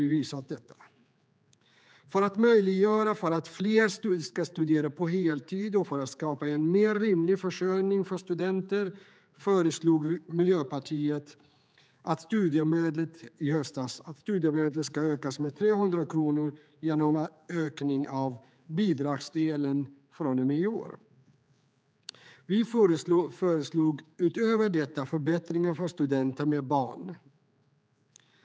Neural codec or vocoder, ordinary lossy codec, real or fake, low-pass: codec, 16 kHz, 4 kbps, X-Codec, HuBERT features, trained on general audio; none; fake; none